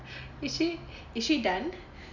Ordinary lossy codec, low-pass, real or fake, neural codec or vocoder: none; 7.2 kHz; real; none